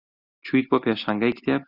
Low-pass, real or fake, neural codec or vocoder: 5.4 kHz; real; none